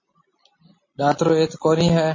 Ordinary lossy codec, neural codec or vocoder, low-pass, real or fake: MP3, 32 kbps; none; 7.2 kHz; real